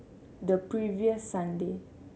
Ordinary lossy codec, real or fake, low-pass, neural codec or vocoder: none; real; none; none